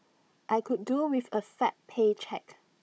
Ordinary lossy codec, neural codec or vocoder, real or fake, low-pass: none; codec, 16 kHz, 16 kbps, FunCodec, trained on Chinese and English, 50 frames a second; fake; none